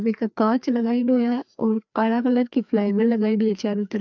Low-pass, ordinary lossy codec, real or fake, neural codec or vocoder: 7.2 kHz; none; fake; codec, 16 kHz, 2 kbps, FreqCodec, larger model